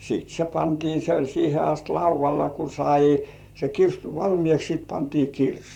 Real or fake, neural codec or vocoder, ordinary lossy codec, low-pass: fake; vocoder, 44.1 kHz, 128 mel bands, Pupu-Vocoder; none; 19.8 kHz